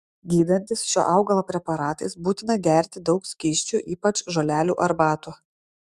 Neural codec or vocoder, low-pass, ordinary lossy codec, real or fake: autoencoder, 48 kHz, 128 numbers a frame, DAC-VAE, trained on Japanese speech; 14.4 kHz; Opus, 64 kbps; fake